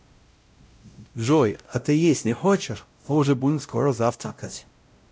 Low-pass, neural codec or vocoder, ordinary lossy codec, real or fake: none; codec, 16 kHz, 0.5 kbps, X-Codec, WavLM features, trained on Multilingual LibriSpeech; none; fake